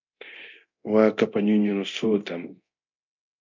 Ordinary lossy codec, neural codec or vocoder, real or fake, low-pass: MP3, 64 kbps; codec, 24 kHz, 0.9 kbps, DualCodec; fake; 7.2 kHz